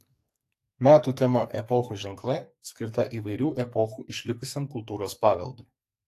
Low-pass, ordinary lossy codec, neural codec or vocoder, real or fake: 14.4 kHz; AAC, 64 kbps; codec, 44.1 kHz, 2.6 kbps, SNAC; fake